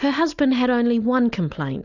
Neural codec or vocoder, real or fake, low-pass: none; real; 7.2 kHz